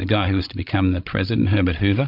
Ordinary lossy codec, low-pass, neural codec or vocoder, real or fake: MP3, 48 kbps; 5.4 kHz; codec, 16 kHz, 16 kbps, FreqCodec, larger model; fake